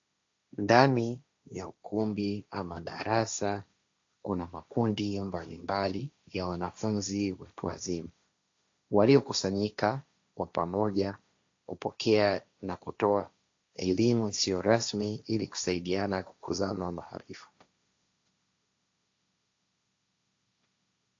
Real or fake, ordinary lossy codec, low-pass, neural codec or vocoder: fake; AAC, 48 kbps; 7.2 kHz; codec, 16 kHz, 1.1 kbps, Voila-Tokenizer